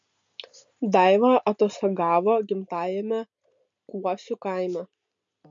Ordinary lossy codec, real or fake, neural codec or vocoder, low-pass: MP3, 48 kbps; real; none; 7.2 kHz